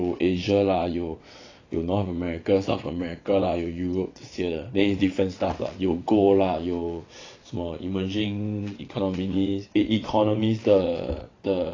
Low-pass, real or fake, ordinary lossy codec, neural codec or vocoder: 7.2 kHz; fake; AAC, 32 kbps; vocoder, 22.05 kHz, 80 mel bands, WaveNeXt